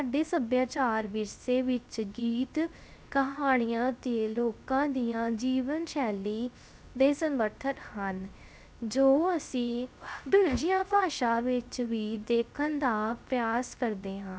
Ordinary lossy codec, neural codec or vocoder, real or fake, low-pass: none; codec, 16 kHz, 0.3 kbps, FocalCodec; fake; none